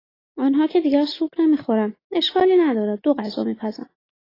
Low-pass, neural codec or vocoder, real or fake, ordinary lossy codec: 5.4 kHz; none; real; AAC, 24 kbps